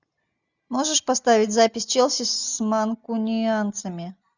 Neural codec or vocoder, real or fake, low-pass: none; real; 7.2 kHz